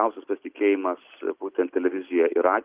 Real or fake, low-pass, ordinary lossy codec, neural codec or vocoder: real; 3.6 kHz; Opus, 24 kbps; none